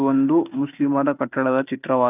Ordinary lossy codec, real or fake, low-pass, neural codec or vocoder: none; real; 3.6 kHz; none